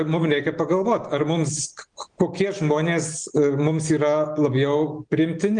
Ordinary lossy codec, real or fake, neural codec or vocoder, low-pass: Opus, 24 kbps; real; none; 9.9 kHz